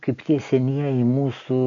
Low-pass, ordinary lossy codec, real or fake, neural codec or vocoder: 7.2 kHz; MP3, 64 kbps; real; none